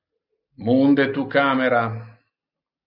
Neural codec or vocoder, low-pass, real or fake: none; 5.4 kHz; real